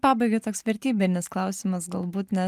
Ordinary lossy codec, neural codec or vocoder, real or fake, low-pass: Opus, 32 kbps; none; real; 14.4 kHz